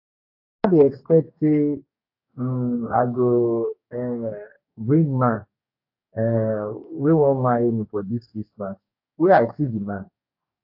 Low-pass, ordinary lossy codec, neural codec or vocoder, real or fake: 5.4 kHz; AAC, 48 kbps; codec, 44.1 kHz, 2.6 kbps, DAC; fake